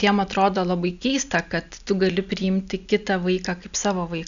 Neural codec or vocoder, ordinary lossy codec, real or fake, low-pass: none; MP3, 96 kbps; real; 7.2 kHz